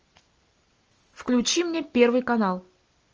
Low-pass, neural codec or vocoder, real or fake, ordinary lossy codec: 7.2 kHz; none; real; Opus, 24 kbps